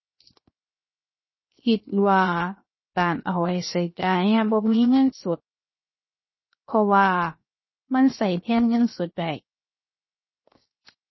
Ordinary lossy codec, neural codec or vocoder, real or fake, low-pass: MP3, 24 kbps; codec, 16 kHz, 0.7 kbps, FocalCodec; fake; 7.2 kHz